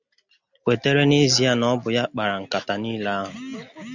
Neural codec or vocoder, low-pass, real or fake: none; 7.2 kHz; real